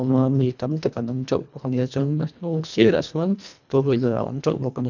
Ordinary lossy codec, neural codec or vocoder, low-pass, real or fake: none; codec, 24 kHz, 1.5 kbps, HILCodec; 7.2 kHz; fake